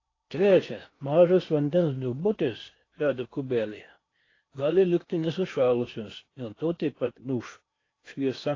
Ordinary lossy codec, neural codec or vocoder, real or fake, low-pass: AAC, 32 kbps; codec, 16 kHz in and 24 kHz out, 0.8 kbps, FocalCodec, streaming, 65536 codes; fake; 7.2 kHz